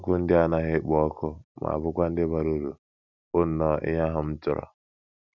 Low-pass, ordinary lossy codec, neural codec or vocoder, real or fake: 7.2 kHz; none; none; real